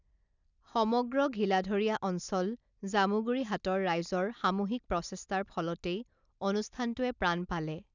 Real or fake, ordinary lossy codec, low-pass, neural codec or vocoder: real; none; 7.2 kHz; none